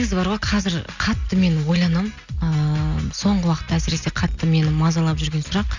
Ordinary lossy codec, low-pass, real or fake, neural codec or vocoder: none; 7.2 kHz; real; none